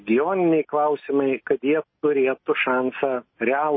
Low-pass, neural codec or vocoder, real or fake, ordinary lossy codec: 7.2 kHz; none; real; MP3, 24 kbps